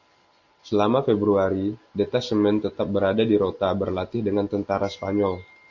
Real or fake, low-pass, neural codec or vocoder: real; 7.2 kHz; none